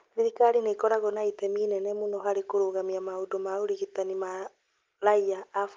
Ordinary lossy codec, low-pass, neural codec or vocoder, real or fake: Opus, 32 kbps; 7.2 kHz; none; real